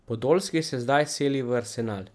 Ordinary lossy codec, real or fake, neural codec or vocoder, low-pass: none; real; none; none